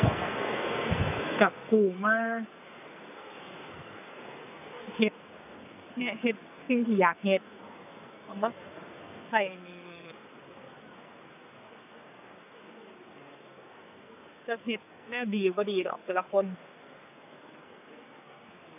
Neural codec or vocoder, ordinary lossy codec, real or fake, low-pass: codec, 44.1 kHz, 2.6 kbps, SNAC; none; fake; 3.6 kHz